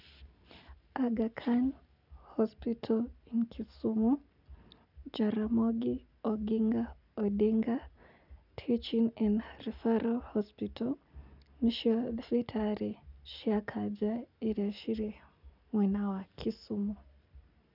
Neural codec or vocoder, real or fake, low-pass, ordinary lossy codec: none; real; 5.4 kHz; none